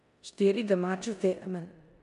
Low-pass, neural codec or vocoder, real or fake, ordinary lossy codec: 10.8 kHz; codec, 16 kHz in and 24 kHz out, 0.9 kbps, LongCat-Audio-Codec, four codebook decoder; fake; none